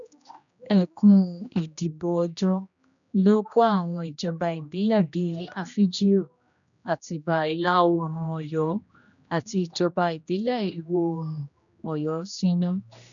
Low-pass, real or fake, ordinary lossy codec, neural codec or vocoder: 7.2 kHz; fake; none; codec, 16 kHz, 1 kbps, X-Codec, HuBERT features, trained on general audio